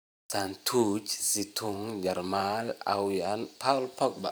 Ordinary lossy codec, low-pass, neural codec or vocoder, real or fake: none; none; vocoder, 44.1 kHz, 128 mel bands every 512 samples, BigVGAN v2; fake